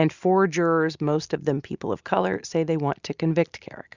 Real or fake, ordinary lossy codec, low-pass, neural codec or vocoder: real; Opus, 64 kbps; 7.2 kHz; none